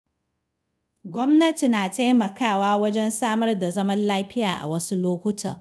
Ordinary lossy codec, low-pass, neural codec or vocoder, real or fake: none; none; codec, 24 kHz, 0.5 kbps, DualCodec; fake